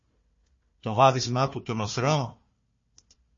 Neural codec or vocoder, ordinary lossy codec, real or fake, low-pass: codec, 16 kHz, 1 kbps, FunCodec, trained on Chinese and English, 50 frames a second; MP3, 32 kbps; fake; 7.2 kHz